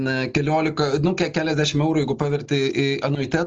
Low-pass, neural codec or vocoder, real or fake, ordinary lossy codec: 7.2 kHz; none; real; Opus, 24 kbps